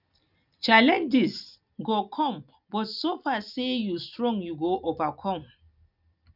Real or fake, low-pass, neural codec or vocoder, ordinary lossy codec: real; 5.4 kHz; none; none